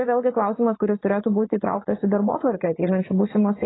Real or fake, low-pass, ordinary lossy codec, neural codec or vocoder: fake; 7.2 kHz; AAC, 16 kbps; vocoder, 44.1 kHz, 80 mel bands, Vocos